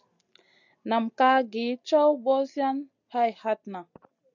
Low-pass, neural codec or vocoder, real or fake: 7.2 kHz; none; real